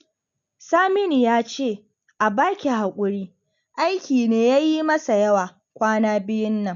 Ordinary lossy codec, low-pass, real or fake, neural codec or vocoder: none; 7.2 kHz; real; none